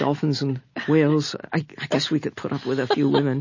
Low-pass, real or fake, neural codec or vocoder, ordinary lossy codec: 7.2 kHz; real; none; MP3, 32 kbps